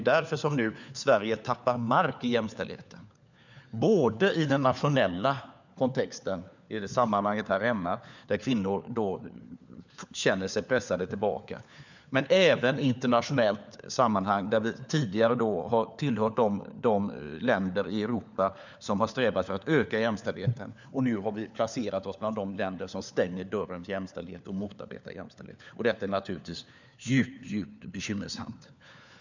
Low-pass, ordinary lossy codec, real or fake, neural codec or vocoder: 7.2 kHz; none; fake; codec, 16 kHz, 4 kbps, FunCodec, trained on LibriTTS, 50 frames a second